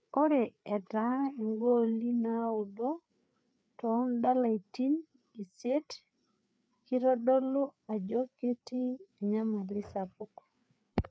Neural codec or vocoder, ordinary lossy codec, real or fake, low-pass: codec, 16 kHz, 4 kbps, FreqCodec, larger model; none; fake; none